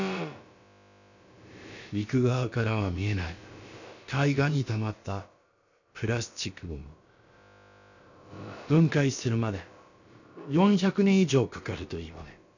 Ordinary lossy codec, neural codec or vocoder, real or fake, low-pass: none; codec, 16 kHz, about 1 kbps, DyCAST, with the encoder's durations; fake; 7.2 kHz